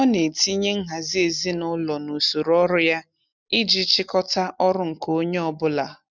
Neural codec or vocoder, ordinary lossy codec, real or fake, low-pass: none; none; real; 7.2 kHz